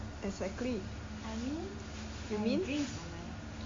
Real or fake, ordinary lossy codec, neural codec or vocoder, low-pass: real; MP3, 48 kbps; none; 7.2 kHz